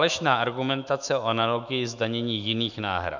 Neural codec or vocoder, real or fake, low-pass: autoencoder, 48 kHz, 128 numbers a frame, DAC-VAE, trained on Japanese speech; fake; 7.2 kHz